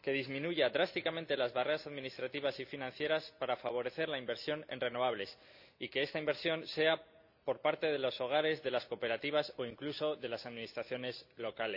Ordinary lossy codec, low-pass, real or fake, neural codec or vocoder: none; 5.4 kHz; real; none